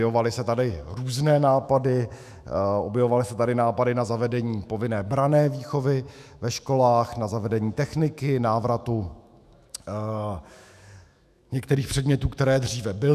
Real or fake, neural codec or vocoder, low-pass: fake; autoencoder, 48 kHz, 128 numbers a frame, DAC-VAE, trained on Japanese speech; 14.4 kHz